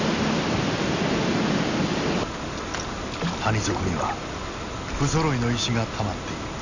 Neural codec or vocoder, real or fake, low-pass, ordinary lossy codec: none; real; 7.2 kHz; none